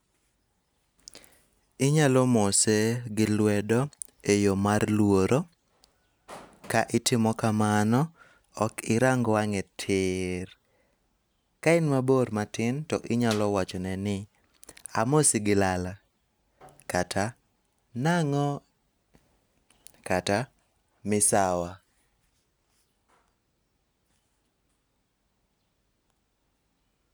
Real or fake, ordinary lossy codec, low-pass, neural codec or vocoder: real; none; none; none